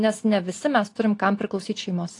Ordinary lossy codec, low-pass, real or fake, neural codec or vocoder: AAC, 48 kbps; 10.8 kHz; real; none